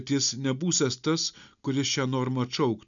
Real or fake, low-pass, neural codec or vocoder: real; 7.2 kHz; none